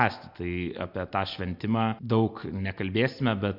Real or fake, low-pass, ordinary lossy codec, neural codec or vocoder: real; 5.4 kHz; MP3, 48 kbps; none